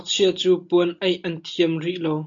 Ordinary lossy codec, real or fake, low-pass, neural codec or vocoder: AAC, 64 kbps; real; 7.2 kHz; none